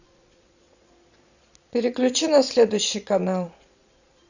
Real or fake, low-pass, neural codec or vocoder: fake; 7.2 kHz; vocoder, 22.05 kHz, 80 mel bands, Vocos